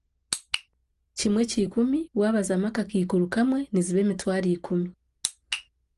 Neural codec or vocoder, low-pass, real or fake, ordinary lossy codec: none; 10.8 kHz; real; Opus, 24 kbps